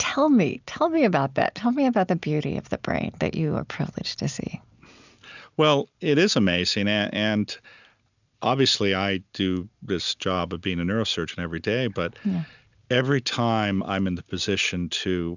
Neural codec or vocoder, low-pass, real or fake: none; 7.2 kHz; real